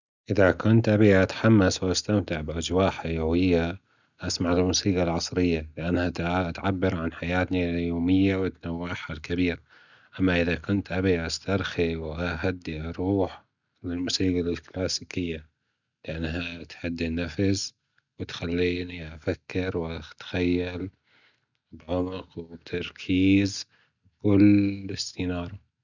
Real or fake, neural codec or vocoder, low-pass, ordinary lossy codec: real; none; 7.2 kHz; none